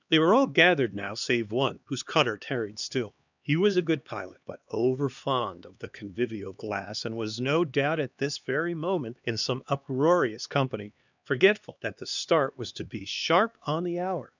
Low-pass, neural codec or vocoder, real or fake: 7.2 kHz; codec, 16 kHz, 2 kbps, X-Codec, HuBERT features, trained on LibriSpeech; fake